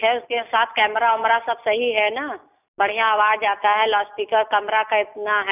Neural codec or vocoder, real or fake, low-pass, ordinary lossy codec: none; real; 3.6 kHz; none